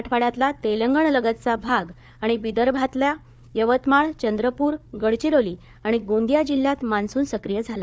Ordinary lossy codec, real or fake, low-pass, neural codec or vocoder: none; fake; none; codec, 16 kHz, 4 kbps, FunCodec, trained on Chinese and English, 50 frames a second